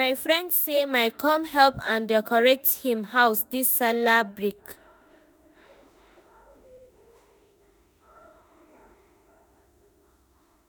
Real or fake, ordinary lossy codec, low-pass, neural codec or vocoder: fake; none; none; autoencoder, 48 kHz, 32 numbers a frame, DAC-VAE, trained on Japanese speech